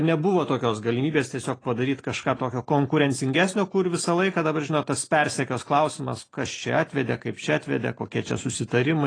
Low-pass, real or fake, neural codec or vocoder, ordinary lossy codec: 9.9 kHz; real; none; AAC, 32 kbps